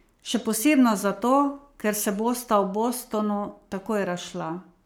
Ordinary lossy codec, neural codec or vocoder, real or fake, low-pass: none; codec, 44.1 kHz, 7.8 kbps, Pupu-Codec; fake; none